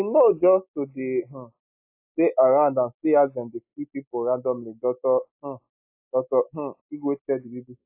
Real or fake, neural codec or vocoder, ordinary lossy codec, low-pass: real; none; AAC, 32 kbps; 3.6 kHz